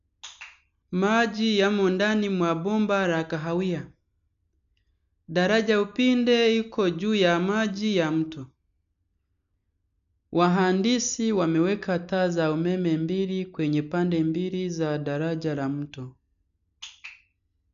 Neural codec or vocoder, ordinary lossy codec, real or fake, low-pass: none; none; real; 7.2 kHz